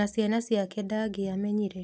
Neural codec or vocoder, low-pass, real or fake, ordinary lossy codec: none; none; real; none